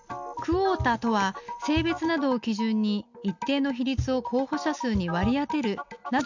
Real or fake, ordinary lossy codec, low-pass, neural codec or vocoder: real; none; 7.2 kHz; none